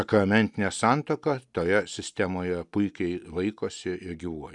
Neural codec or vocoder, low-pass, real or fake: none; 10.8 kHz; real